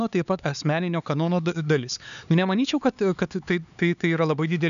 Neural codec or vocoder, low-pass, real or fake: codec, 16 kHz, 4 kbps, X-Codec, HuBERT features, trained on LibriSpeech; 7.2 kHz; fake